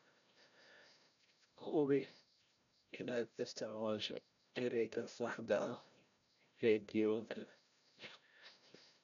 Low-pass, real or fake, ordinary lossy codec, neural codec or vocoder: 7.2 kHz; fake; none; codec, 16 kHz, 0.5 kbps, FreqCodec, larger model